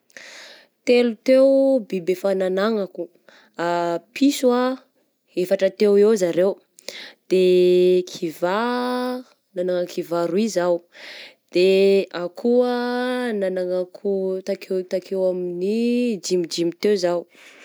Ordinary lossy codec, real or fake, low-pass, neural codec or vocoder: none; real; none; none